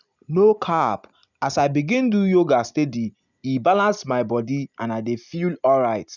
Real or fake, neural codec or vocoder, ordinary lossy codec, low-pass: real; none; none; 7.2 kHz